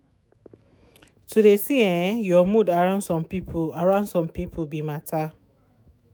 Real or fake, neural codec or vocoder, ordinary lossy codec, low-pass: fake; autoencoder, 48 kHz, 128 numbers a frame, DAC-VAE, trained on Japanese speech; none; none